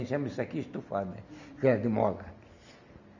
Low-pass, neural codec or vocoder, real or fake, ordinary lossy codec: 7.2 kHz; none; real; none